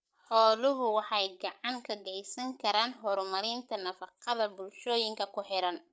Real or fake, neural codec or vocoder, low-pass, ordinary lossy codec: fake; codec, 16 kHz, 8 kbps, FreqCodec, larger model; none; none